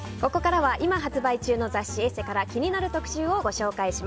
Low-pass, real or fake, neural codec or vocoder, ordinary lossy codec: none; real; none; none